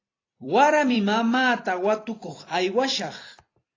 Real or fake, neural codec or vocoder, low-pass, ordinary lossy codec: fake; vocoder, 44.1 kHz, 128 mel bands every 256 samples, BigVGAN v2; 7.2 kHz; AAC, 32 kbps